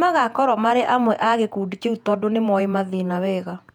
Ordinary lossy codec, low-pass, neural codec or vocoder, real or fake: none; 19.8 kHz; vocoder, 48 kHz, 128 mel bands, Vocos; fake